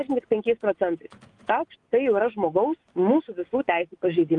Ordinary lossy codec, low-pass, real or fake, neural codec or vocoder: Opus, 32 kbps; 10.8 kHz; fake; vocoder, 24 kHz, 100 mel bands, Vocos